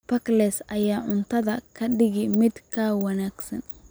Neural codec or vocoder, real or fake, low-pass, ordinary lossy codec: none; real; none; none